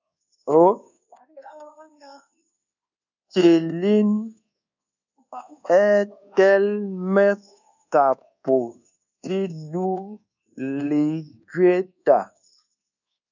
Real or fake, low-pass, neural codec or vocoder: fake; 7.2 kHz; codec, 24 kHz, 1.2 kbps, DualCodec